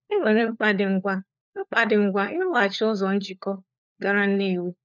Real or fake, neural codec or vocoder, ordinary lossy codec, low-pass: fake; codec, 16 kHz, 4 kbps, FunCodec, trained on LibriTTS, 50 frames a second; none; 7.2 kHz